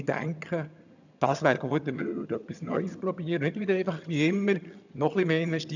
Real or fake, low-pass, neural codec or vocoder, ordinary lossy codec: fake; 7.2 kHz; vocoder, 22.05 kHz, 80 mel bands, HiFi-GAN; none